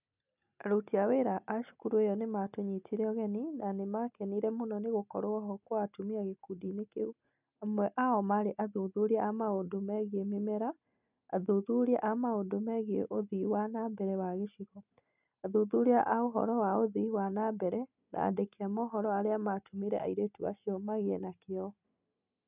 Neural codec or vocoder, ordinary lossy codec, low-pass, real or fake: none; none; 3.6 kHz; real